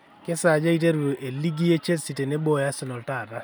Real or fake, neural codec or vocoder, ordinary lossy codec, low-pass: real; none; none; none